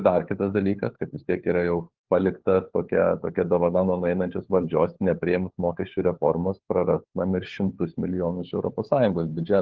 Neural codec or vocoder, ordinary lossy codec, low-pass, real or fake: codec, 16 kHz, 4 kbps, FunCodec, trained on LibriTTS, 50 frames a second; Opus, 16 kbps; 7.2 kHz; fake